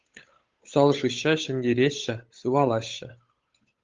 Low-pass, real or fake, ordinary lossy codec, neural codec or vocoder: 7.2 kHz; fake; Opus, 32 kbps; codec, 16 kHz, 8 kbps, FunCodec, trained on Chinese and English, 25 frames a second